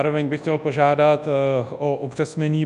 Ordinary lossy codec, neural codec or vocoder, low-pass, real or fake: AAC, 96 kbps; codec, 24 kHz, 0.9 kbps, WavTokenizer, large speech release; 10.8 kHz; fake